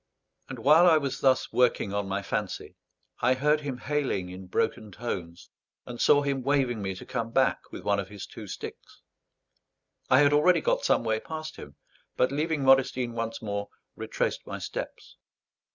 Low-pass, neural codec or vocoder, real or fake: 7.2 kHz; none; real